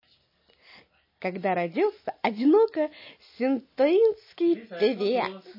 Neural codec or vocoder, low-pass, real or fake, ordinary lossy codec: none; 5.4 kHz; real; MP3, 24 kbps